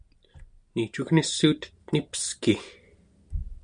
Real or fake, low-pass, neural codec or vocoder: real; 9.9 kHz; none